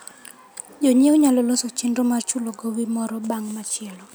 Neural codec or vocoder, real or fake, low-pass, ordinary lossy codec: none; real; none; none